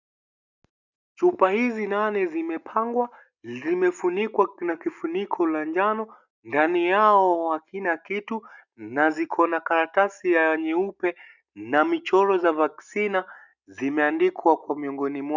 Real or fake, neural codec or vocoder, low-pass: real; none; 7.2 kHz